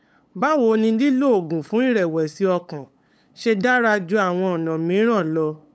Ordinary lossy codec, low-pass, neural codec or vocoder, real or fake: none; none; codec, 16 kHz, 8 kbps, FunCodec, trained on LibriTTS, 25 frames a second; fake